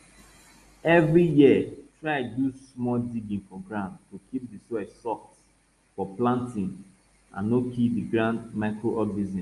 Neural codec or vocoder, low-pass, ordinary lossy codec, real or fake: none; 10.8 kHz; Opus, 32 kbps; real